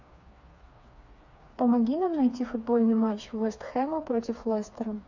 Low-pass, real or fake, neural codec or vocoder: 7.2 kHz; fake; codec, 16 kHz, 4 kbps, FreqCodec, smaller model